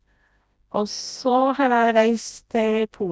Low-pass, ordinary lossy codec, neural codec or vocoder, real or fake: none; none; codec, 16 kHz, 1 kbps, FreqCodec, smaller model; fake